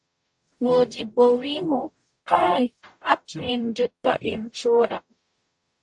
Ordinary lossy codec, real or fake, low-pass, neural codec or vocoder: AAC, 64 kbps; fake; 10.8 kHz; codec, 44.1 kHz, 0.9 kbps, DAC